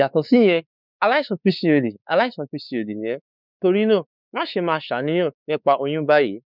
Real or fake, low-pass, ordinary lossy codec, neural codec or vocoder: fake; 5.4 kHz; none; codec, 16 kHz, 4 kbps, X-Codec, WavLM features, trained on Multilingual LibriSpeech